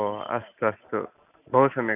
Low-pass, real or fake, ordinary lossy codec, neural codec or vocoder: 3.6 kHz; real; none; none